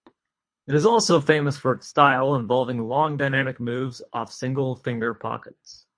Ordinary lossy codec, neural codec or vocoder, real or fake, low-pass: MP3, 48 kbps; codec, 24 kHz, 3 kbps, HILCodec; fake; 9.9 kHz